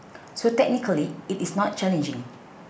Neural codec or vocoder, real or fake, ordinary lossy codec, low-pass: none; real; none; none